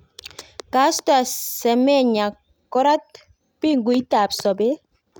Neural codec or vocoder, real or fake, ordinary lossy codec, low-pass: none; real; none; none